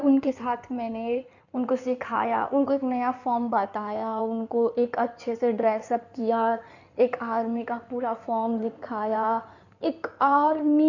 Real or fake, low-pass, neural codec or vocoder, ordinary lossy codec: fake; 7.2 kHz; codec, 16 kHz in and 24 kHz out, 2.2 kbps, FireRedTTS-2 codec; none